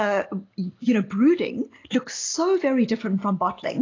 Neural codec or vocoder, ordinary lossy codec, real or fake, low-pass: none; AAC, 32 kbps; real; 7.2 kHz